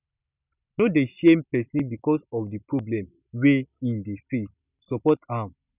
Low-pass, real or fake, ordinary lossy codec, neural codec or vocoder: 3.6 kHz; real; none; none